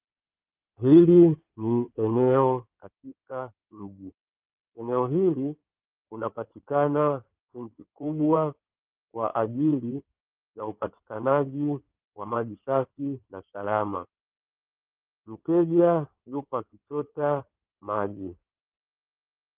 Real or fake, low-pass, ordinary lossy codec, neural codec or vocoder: fake; 3.6 kHz; Opus, 32 kbps; codec, 16 kHz in and 24 kHz out, 2.2 kbps, FireRedTTS-2 codec